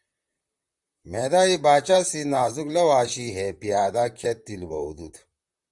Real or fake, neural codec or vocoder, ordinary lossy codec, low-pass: fake; vocoder, 44.1 kHz, 128 mel bands, Pupu-Vocoder; Opus, 64 kbps; 10.8 kHz